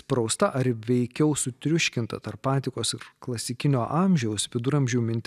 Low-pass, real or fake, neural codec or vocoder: 14.4 kHz; real; none